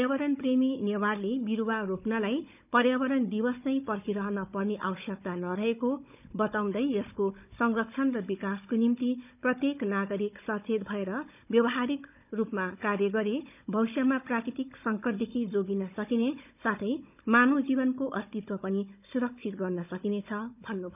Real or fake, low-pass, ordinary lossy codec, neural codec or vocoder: fake; 3.6 kHz; none; codec, 16 kHz, 16 kbps, FunCodec, trained on Chinese and English, 50 frames a second